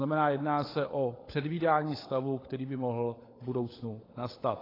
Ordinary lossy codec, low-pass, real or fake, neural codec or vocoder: AAC, 24 kbps; 5.4 kHz; fake; codec, 16 kHz, 16 kbps, FunCodec, trained on LibriTTS, 50 frames a second